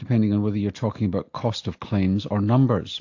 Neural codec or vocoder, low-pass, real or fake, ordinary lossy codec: none; 7.2 kHz; real; AAC, 48 kbps